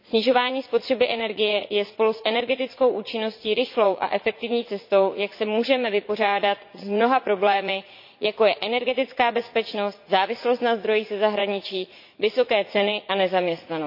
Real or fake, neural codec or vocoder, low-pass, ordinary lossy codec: fake; vocoder, 44.1 kHz, 80 mel bands, Vocos; 5.4 kHz; MP3, 32 kbps